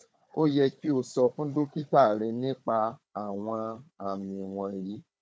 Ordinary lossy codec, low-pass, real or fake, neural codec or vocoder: none; none; fake; codec, 16 kHz, 4 kbps, FunCodec, trained on Chinese and English, 50 frames a second